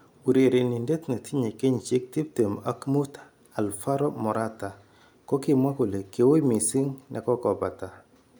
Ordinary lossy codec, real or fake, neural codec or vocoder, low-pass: none; real; none; none